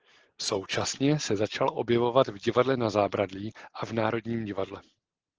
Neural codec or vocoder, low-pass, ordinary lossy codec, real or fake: none; 7.2 kHz; Opus, 16 kbps; real